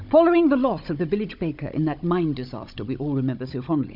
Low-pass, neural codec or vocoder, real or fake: 5.4 kHz; codec, 16 kHz, 16 kbps, FunCodec, trained on Chinese and English, 50 frames a second; fake